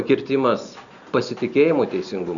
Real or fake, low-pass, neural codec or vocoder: real; 7.2 kHz; none